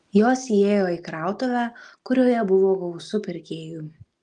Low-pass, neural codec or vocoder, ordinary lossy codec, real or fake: 10.8 kHz; none; Opus, 24 kbps; real